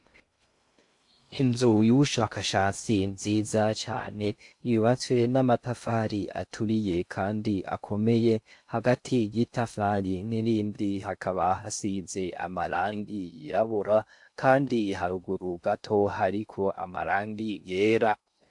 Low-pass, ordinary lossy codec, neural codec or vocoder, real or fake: 10.8 kHz; AAC, 64 kbps; codec, 16 kHz in and 24 kHz out, 0.6 kbps, FocalCodec, streaming, 2048 codes; fake